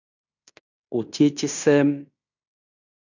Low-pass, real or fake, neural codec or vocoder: 7.2 kHz; fake; codec, 16 kHz in and 24 kHz out, 0.9 kbps, LongCat-Audio-Codec, fine tuned four codebook decoder